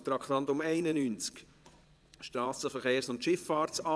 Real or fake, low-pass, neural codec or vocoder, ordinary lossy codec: fake; none; vocoder, 22.05 kHz, 80 mel bands, Vocos; none